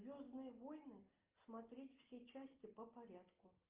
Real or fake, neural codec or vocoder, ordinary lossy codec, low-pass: real; none; Opus, 24 kbps; 3.6 kHz